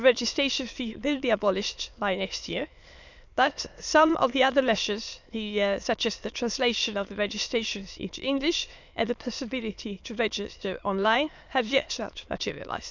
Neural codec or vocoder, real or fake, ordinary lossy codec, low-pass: autoencoder, 22.05 kHz, a latent of 192 numbers a frame, VITS, trained on many speakers; fake; none; 7.2 kHz